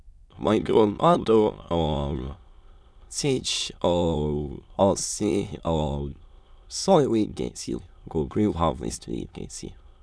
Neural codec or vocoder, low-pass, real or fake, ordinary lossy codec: autoencoder, 22.05 kHz, a latent of 192 numbers a frame, VITS, trained on many speakers; none; fake; none